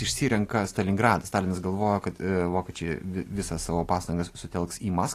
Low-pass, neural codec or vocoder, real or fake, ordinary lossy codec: 14.4 kHz; none; real; AAC, 48 kbps